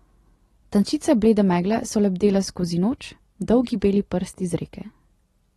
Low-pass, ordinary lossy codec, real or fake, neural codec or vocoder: 19.8 kHz; AAC, 32 kbps; real; none